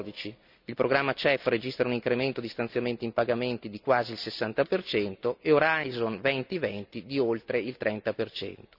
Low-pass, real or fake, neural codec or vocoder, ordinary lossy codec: 5.4 kHz; real; none; none